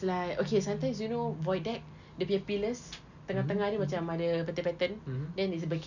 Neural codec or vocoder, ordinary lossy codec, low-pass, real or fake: none; none; 7.2 kHz; real